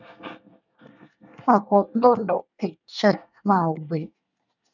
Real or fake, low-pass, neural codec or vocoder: fake; 7.2 kHz; codec, 24 kHz, 1 kbps, SNAC